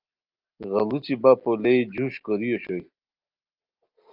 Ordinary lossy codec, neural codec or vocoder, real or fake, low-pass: Opus, 24 kbps; none; real; 5.4 kHz